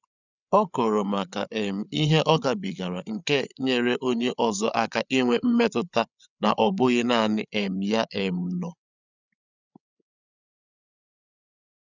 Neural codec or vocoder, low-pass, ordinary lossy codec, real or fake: codec, 16 kHz, 8 kbps, FreqCodec, larger model; 7.2 kHz; none; fake